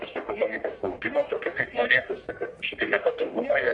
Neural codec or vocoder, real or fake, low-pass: codec, 44.1 kHz, 1.7 kbps, Pupu-Codec; fake; 10.8 kHz